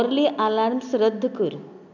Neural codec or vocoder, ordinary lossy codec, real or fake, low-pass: none; none; real; 7.2 kHz